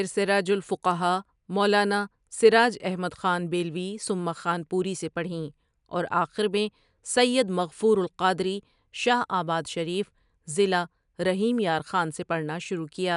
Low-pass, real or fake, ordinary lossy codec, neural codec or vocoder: 10.8 kHz; real; none; none